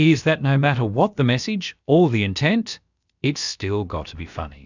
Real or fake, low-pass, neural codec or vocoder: fake; 7.2 kHz; codec, 16 kHz, about 1 kbps, DyCAST, with the encoder's durations